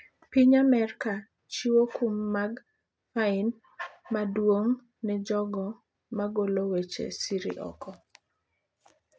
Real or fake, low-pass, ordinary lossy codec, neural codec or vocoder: real; none; none; none